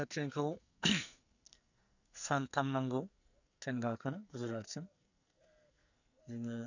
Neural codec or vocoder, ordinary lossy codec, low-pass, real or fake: codec, 44.1 kHz, 2.6 kbps, SNAC; none; 7.2 kHz; fake